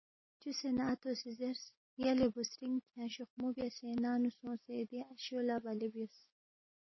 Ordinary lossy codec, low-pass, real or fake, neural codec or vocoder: MP3, 24 kbps; 7.2 kHz; real; none